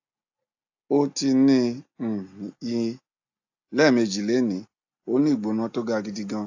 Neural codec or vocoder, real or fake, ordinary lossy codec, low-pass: none; real; AAC, 48 kbps; 7.2 kHz